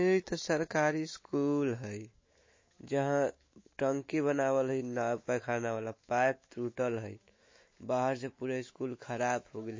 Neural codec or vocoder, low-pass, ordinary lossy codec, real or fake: autoencoder, 48 kHz, 128 numbers a frame, DAC-VAE, trained on Japanese speech; 7.2 kHz; MP3, 32 kbps; fake